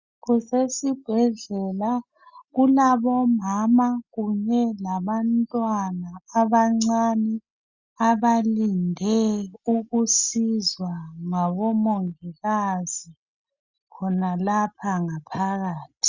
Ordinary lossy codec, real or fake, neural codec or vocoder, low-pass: Opus, 64 kbps; real; none; 7.2 kHz